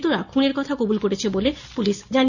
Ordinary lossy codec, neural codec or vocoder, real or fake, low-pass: none; none; real; 7.2 kHz